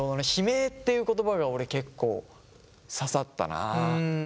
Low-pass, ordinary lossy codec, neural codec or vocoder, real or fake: none; none; none; real